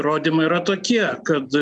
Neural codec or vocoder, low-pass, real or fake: none; 9.9 kHz; real